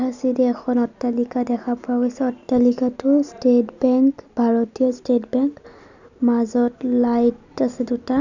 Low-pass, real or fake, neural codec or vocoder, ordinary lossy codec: 7.2 kHz; real; none; none